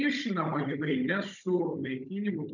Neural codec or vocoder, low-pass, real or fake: codec, 16 kHz, 16 kbps, FunCodec, trained on Chinese and English, 50 frames a second; 7.2 kHz; fake